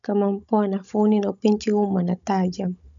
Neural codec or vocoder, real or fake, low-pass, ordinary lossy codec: codec, 16 kHz, 16 kbps, FunCodec, trained on Chinese and English, 50 frames a second; fake; 7.2 kHz; none